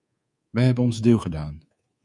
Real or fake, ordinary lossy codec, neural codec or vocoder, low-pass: fake; Opus, 64 kbps; codec, 24 kHz, 3.1 kbps, DualCodec; 10.8 kHz